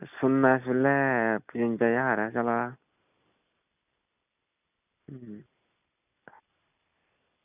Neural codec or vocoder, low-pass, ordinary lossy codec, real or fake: none; 3.6 kHz; none; real